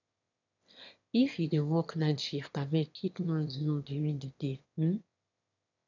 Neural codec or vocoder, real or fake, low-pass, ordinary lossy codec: autoencoder, 22.05 kHz, a latent of 192 numbers a frame, VITS, trained on one speaker; fake; 7.2 kHz; none